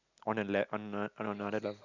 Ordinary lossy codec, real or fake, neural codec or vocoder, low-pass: none; real; none; 7.2 kHz